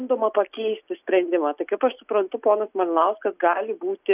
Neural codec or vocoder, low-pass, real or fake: none; 3.6 kHz; real